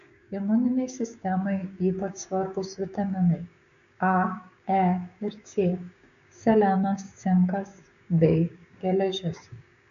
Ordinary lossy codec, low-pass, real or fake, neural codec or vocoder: AAC, 96 kbps; 7.2 kHz; fake; codec, 16 kHz, 6 kbps, DAC